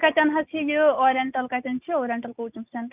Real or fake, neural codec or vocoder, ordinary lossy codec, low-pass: real; none; none; 3.6 kHz